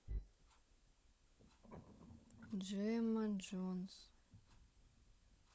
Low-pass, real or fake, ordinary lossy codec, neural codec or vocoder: none; fake; none; codec, 16 kHz, 16 kbps, FunCodec, trained on LibriTTS, 50 frames a second